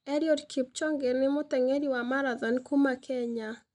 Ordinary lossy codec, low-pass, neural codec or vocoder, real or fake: none; 10.8 kHz; none; real